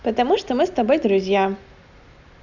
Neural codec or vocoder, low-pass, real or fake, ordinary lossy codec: none; 7.2 kHz; real; none